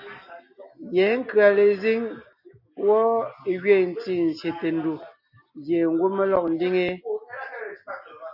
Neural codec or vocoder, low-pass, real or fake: none; 5.4 kHz; real